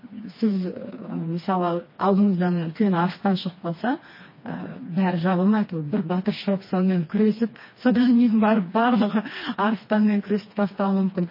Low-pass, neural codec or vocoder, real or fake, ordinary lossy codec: 5.4 kHz; codec, 16 kHz, 2 kbps, FreqCodec, smaller model; fake; MP3, 24 kbps